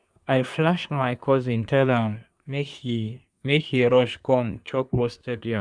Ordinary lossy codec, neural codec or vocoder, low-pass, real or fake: none; codec, 24 kHz, 1 kbps, SNAC; 9.9 kHz; fake